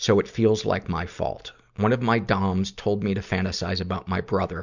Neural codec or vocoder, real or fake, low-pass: none; real; 7.2 kHz